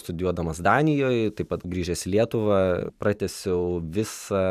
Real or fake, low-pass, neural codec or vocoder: fake; 14.4 kHz; vocoder, 44.1 kHz, 128 mel bands every 512 samples, BigVGAN v2